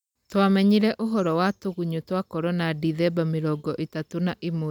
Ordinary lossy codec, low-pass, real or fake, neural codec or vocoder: none; 19.8 kHz; real; none